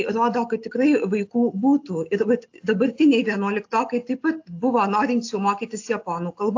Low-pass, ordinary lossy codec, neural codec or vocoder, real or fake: 7.2 kHz; AAC, 48 kbps; none; real